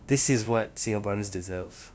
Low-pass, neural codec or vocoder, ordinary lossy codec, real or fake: none; codec, 16 kHz, 0.5 kbps, FunCodec, trained on LibriTTS, 25 frames a second; none; fake